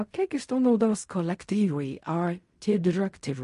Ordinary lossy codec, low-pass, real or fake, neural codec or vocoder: MP3, 48 kbps; 10.8 kHz; fake; codec, 16 kHz in and 24 kHz out, 0.4 kbps, LongCat-Audio-Codec, fine tuned four codebook decoder